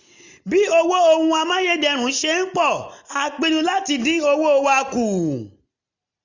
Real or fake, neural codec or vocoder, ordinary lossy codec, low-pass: real; none; none; 7.2 kHz